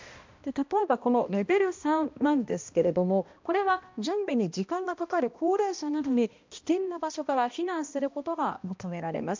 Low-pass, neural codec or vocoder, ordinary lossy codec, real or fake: 7.2 kHz; codec, 16 kHz, 1 kbps, X-Codec, HuBERT features, trained on balanced general audio; MP3, 64 kbps; fake